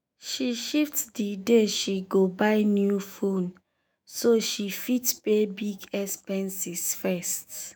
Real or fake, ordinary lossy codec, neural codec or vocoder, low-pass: fake; none; autoencoder, 48 kHz, 128 numbers a frame, DAC-VAE, trained on Japanese speech; none